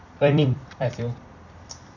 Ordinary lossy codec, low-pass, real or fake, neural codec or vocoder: none; 7.2 kHz; fake; vocoder, 44.1 kHz, 128 mel bands every 256 samples, BigVGAN v2